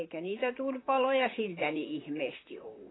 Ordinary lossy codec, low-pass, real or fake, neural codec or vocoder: AAC, 16 kbps; 7.2 kHz; fake; vocoder, 44.1 kHz, 128 mel bands, Pupu-Vocoder